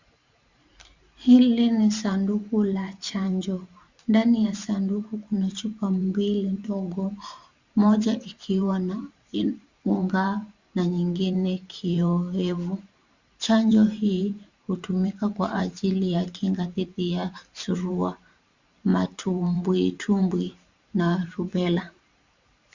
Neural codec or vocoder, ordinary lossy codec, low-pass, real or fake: vocoder, 44.1 kHz, 128 mel bands every 512 samples, BigVGAN v2; Opus, 64 kbps; 7.2 kHz; fake